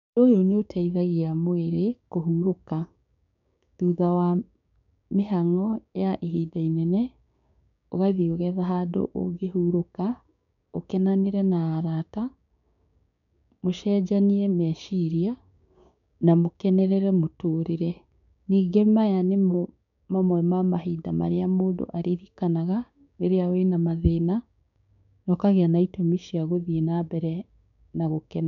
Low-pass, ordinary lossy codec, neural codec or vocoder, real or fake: 7.2 kHz; none; codec, 16 kHz, 6 kbps, DAC; fake